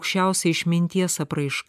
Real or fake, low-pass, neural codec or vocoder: real; 14.4 kHz; none